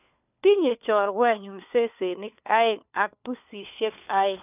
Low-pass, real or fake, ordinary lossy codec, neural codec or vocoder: 3.6 kHz; fake; none; codec, 16 kHz, 4 kbps, FunCodec, trained on LibriTTS, 50 frames a second